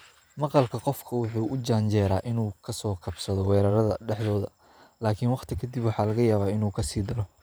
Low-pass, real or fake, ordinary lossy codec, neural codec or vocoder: none; fake; none; vocoder, 44.1 kHz, 128 mel bands every 512 samples, BigVGAN v2